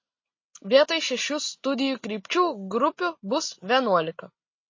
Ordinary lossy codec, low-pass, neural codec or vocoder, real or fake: MP3, 32 kbps; 7.2 kHz; none; real